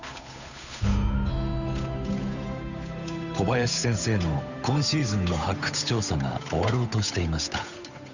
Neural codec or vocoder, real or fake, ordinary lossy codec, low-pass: codec, 16 kHz, 8 kbps, FunCodec, trained on Chinese and English, 25 frames a second; fake; none; 7.2 kHz